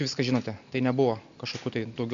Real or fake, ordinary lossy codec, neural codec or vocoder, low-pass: real; AAC, 64 kbps; none; 7.2 kHz